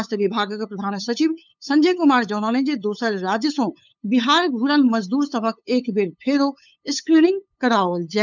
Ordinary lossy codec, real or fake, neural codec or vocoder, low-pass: none; fake; codec, 16 kHz, 8 kbps, FunCodec, trained on LibriTTS, 25 frames a second; 7.2 kHz